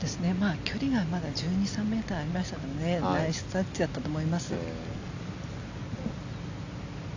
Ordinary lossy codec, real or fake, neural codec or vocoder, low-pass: AAC, 48 kbps; real; none; 7.2 kHz